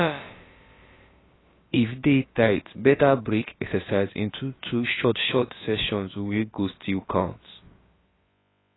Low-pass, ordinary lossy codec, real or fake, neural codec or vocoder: 7.2 kHz; AAC, 16 kbps; fake; codec, 16 kHz, about 1 kbps, DyCAST, with the encoder's durations